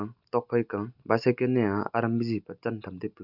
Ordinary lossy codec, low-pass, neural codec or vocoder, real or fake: Opus, 64 kbps; 5.4 kHz; none; real